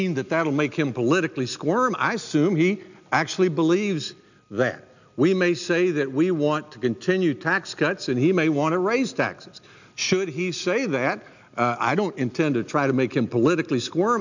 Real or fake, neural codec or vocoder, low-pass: real; none; 7.2 kHz